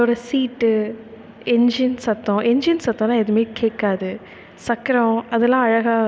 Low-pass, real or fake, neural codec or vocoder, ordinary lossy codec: none; real; none; none